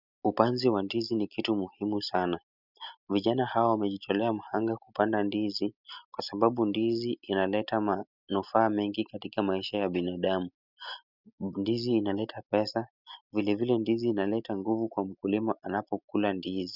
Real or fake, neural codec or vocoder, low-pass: real; none; 5.4 kHz